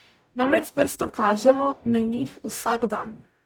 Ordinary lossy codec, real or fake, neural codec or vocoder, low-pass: none; fake; codec, 44.1 kHz, 0.9 kbps, DAC; none